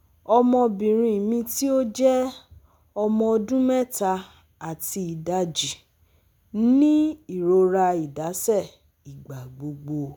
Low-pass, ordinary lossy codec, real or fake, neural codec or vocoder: none; none; real; none